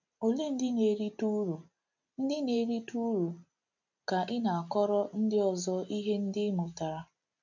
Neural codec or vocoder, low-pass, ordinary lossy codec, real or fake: none; 7.2 kHz; none; real